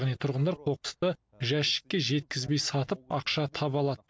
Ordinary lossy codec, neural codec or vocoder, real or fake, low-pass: none; none; real; none